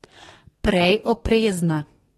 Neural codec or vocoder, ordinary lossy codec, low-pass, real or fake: codec, 32 kHz, 1.9 kbps, SNAC; AAC, 32 kbps; 14.4 kHz; fake